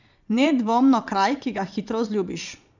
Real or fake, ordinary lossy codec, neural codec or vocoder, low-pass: fake; none; vocoder, 22.05 kHz, 80 mel bands, Vocos; 7.2 kHz